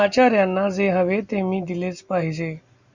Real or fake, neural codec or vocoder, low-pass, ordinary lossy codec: real; none; 7.2 kHz; Opus, 64 kbps